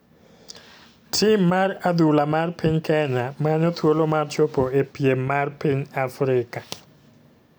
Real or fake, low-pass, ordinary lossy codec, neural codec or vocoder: real; none; none; none